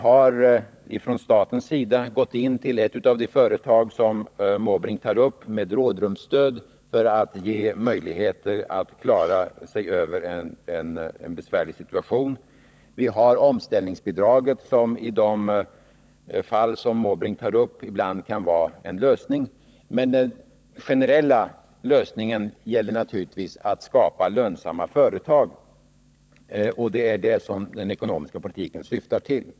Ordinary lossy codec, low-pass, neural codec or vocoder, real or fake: none; none; codec, 16 kHz, 16 kbps, FunCodec, trained on LibriTTS, 50 frames a second; fake